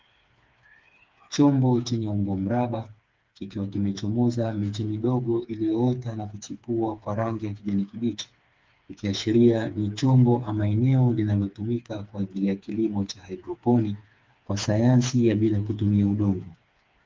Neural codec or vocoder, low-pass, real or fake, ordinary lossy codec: codec, 16 kHz, 4 kbps, FreqCodec, smaller model; 7.2 kHz; fake; Opus, 24 kbps